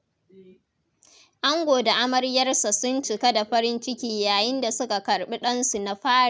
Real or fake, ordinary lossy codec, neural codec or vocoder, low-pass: real; none; none; none